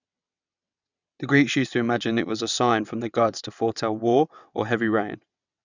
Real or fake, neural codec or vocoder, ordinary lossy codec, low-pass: fake; vocoder, 22.05 kHz, 80 mel bands, WaveNeXt; none; 7.2 kHz